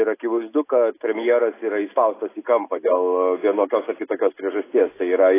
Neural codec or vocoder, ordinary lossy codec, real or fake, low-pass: none; AAC, 16 kbps; real; 3.6 kHz